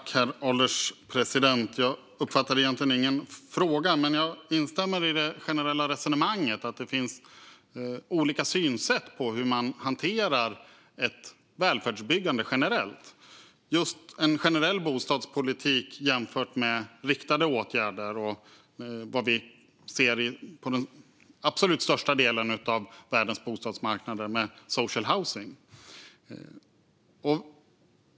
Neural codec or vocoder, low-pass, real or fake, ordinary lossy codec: none; none; real; none